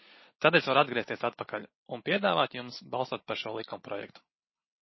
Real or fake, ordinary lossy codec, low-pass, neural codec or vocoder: real; MP3, 24 kbps; 7.2 kHz; none